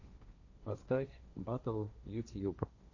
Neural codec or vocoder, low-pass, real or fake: codec, 16 kHz, 1.1 kbps, Voila-Tokenizer; 7.2 kHz; fake